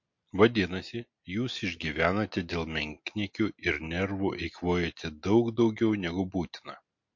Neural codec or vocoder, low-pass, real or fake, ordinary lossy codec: none; 7.2 kHz; real; MP3, 48 kbps